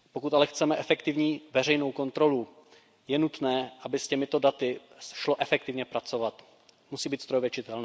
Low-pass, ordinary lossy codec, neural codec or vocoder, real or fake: none; none; none; real